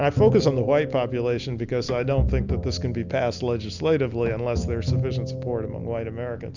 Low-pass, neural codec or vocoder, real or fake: 7.2 kHz; none; real